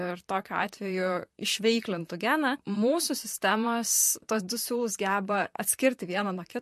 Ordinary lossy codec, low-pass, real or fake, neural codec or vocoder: MP3, 64 kbps; 14.4 kHz; fake; vocoder, 44.1 kHz, 128 mel bands, Pupu-Vocoder